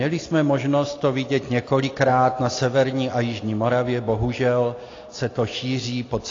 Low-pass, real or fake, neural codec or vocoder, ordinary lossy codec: 7.2 kHz; real; none; AAC, 32 kbps